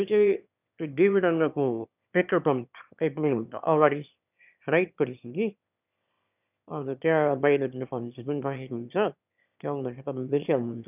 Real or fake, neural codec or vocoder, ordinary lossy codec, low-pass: fake; autoencoder, 22.05 kHz, a latent of 192 numbers a frame, VITS, trained on one speaker; none; 3.6 kHz